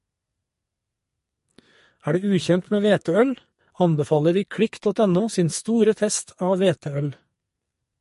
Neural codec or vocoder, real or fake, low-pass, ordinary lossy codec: codec, 44.1 kHz, 2.6 kbps, SNAC; fake; 14.4 kHz; MP3, 48 kbps